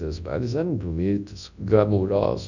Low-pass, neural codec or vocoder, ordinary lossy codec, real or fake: 7.2 kHz; codec, 24 kHz, 0.9 kbps, WavTokenizer, large speech release; none; fake